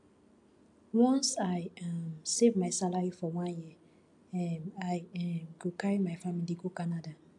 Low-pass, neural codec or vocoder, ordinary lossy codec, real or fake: 10.8 kHz; none; AAC, 64 kbps; real